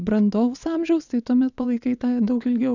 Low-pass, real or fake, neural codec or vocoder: 7.2 kHz; fake; vocoder, 22.05 kHz, 80 mel bands, WaveNeXt